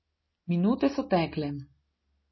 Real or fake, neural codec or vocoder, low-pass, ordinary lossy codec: real; none; 7.2 kHz; MP3, 24 kbps